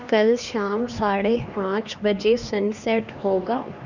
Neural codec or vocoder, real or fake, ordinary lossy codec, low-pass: codec, 16 kHz, 2 kbps, X-Codec, HuBERT features, trained on LibriSpeech; fake; none; 7.2 kHz